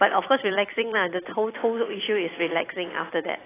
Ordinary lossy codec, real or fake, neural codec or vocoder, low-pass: AAC, 16 kbps; fake; vocoder, 44.1 kHz, 128 mel bands every 256 samples, BigVGAN v2; 3.6 kHz